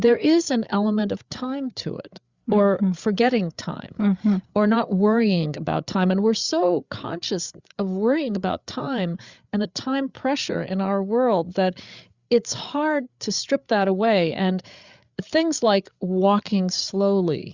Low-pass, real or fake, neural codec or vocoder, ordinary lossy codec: 7.2 kHz; fake; codec, 16 kHz, 8 kbps, FreqCodec, larger model; Opus, 64 kbps